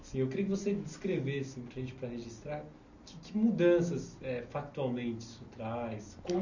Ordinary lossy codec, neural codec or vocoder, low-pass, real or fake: none; none; 7.2 kHz; real